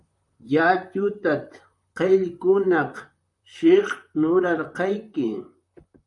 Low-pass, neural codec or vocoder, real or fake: 10.8 kHz; vocoder, 44.1 kHz, 128 mel bands, Pupu-Vocoder; fake